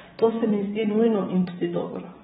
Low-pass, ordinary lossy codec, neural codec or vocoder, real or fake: 9.9 kHz; AAC, 16 kbps; vocoder, 22.05 kHz, 80 mel bands, Vocos; fake